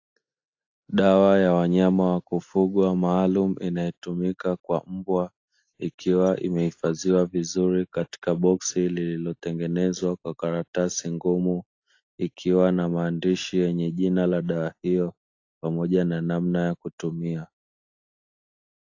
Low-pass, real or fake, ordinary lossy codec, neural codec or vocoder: 7.2 kHz; real; AAC, 48 kbps; none